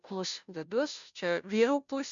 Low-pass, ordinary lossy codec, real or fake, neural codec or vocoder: 7.2 kHz; MP3, 64 kbps; fake; codec, 16 kHz, 0.5 kbps, FunCodec, trained on Chinese and English, 25 frames a second